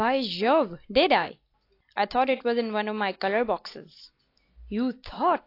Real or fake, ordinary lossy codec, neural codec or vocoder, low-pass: real; AAC, 32 kbps; none; 5.4 kHz